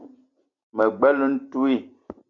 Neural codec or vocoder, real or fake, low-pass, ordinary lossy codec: none; real; 7.2 kHz; AAC, 48 kbps